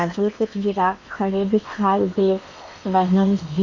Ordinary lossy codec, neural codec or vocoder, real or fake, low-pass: Opus, 64 kbps; codec, 16 kHz in and 24 kHz out, 0.8 kbps, FocalCodec, streaming, 65536 codes; fake; 7.2 kHz